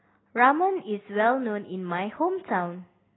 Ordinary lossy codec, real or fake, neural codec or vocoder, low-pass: AAC, 16 kbps; real; none; 7.2 kHz